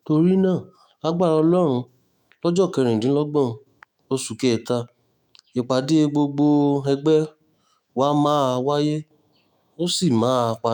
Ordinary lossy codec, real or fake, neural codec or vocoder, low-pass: none; fake; autoencoder, 48 kHz, 128 numbers a frame, DAC-VAE, trained on Japanese speech; 19.8 kHz